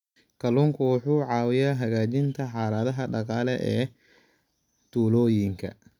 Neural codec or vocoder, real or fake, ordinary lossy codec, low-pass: none; real; none; 19.8 kHz